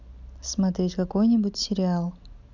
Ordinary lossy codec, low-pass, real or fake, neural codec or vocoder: none; 7.2 kHz; real; none